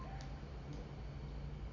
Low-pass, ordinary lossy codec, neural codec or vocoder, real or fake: 7.2 kHz; Opus, 64 kbps; none; real